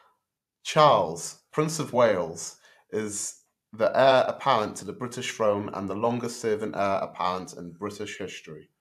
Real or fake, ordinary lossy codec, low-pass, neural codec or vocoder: fake; AAC, 96 kbps; 14.4 kHz; vocoder, 44.1 kHz, 128 mel bands every 512 samples, BigVGAN v2